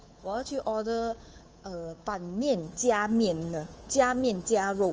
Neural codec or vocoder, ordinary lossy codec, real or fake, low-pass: codec, 24 kHz, 3.1 kbps, DualCodec; Opus, 24 kbps; fake; 7.2 kHz